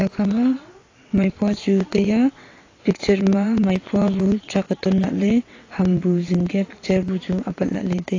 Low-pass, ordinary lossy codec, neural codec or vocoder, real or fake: 7.2 kHz; AAC, 32 kbps; vocoder, 22.05 kHz, 80 mel bands, WaveNeXt; fake